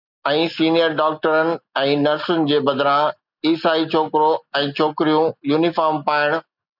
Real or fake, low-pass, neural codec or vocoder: real; 5.4 kHz; none